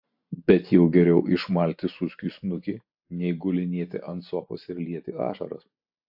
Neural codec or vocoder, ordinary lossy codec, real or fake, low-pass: none; AAC, 48 kbps; real; 5.4 kHz